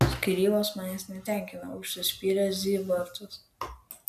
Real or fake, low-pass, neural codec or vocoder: real; 14.4 kHz; none